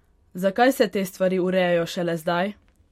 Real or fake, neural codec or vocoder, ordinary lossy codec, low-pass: fake; vocoder, 44.1 kHz, 128 mel bands every 256 samples, BigVGAN v2; MP3, 64 kbps; 19.8 kHz